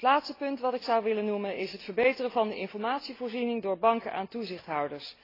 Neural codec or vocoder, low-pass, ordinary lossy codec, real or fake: none; 5.4 kHz; AAC, 24 kbps; real